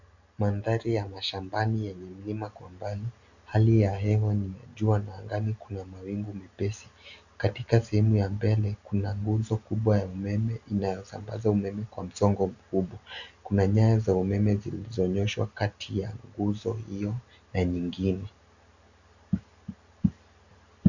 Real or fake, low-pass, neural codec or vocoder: real; 7.2 kHz; none